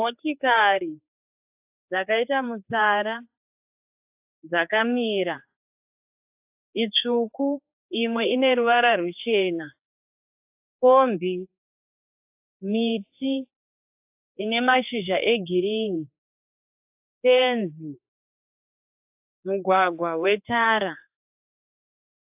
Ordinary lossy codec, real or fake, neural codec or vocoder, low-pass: AAC, 32 kbps; fake; codec, 16 kHz, 4 kbps, X-Codec, HuBERT features, trained on general audio; 3.6 kHz